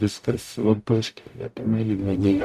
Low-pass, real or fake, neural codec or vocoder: 14.4 kHz; fake; codec, 44.1 kHz, 0.9 kbps, DAC